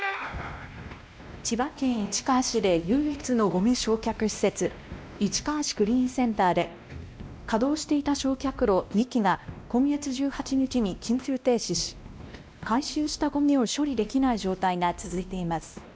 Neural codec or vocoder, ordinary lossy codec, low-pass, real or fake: codec, 16 kHz, 1 kbps, X-Codec, WavLM features, trained on Multilingual LibriSpeech; none; none; fake